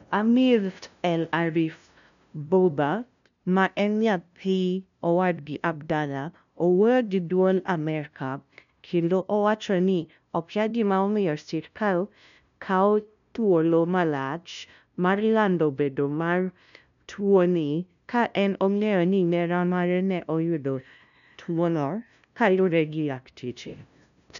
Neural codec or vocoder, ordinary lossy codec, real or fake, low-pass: codec, 16 kHz, 0.5 kbps, FunCodec, trained on LibriTTS, 25 frames a second; MP3, 96 kbps; fake; 7.2 kHz